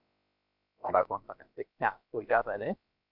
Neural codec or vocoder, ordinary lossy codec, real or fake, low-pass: codec, 16 kHz, about 1 kbps, DyCAST, with the encoder's durations; MP3, 48 kbps; fake; 5.4 kHz